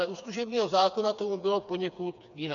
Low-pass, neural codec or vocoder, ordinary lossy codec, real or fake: 7.2 kHz; codec, 16 kHz, 4 kbps, FreqCodec, smaller model; MP3, 96 kbps; fake